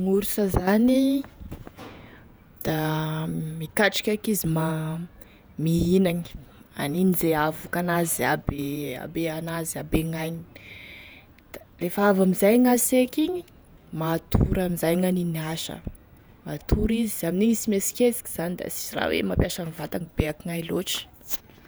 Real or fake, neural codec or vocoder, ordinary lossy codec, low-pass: fake; vocoder, 48 kHz, 128 mel bands, Vocos; none; none